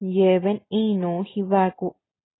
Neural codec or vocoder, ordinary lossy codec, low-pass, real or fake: none; AAC, 16 kbps; 7.2 kHz; real